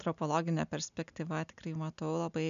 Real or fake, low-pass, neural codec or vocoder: real; 7.2 kHz; none